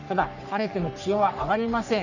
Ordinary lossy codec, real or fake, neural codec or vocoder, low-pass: none; fake; codec, 44.1 kHz, 3.4 kbps, Pupu-Codec; 7.2 kHz